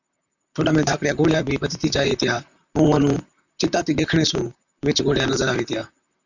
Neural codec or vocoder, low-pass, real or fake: vocoder, 44.1 kHz, 128 mel bands, Pupu-Vocoder; 7.2 kHz; fake